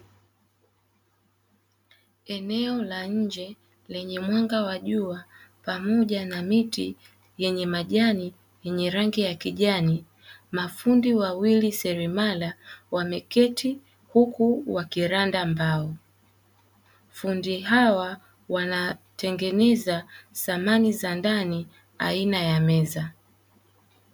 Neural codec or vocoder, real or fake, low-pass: none; real; 19.8 kHz